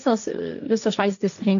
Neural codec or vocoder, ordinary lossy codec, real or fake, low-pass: codec, 16 kHz, 1.1 kbps, Voila-Tokenizer; AAC, 64 kbps; fake; 7.2 kHz